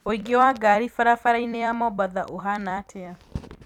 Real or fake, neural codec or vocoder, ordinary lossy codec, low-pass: fake; vocoder, 44.1 kHz, 128 mel bands every 256 samples, BigVGAN v2; none; 19.8 kHz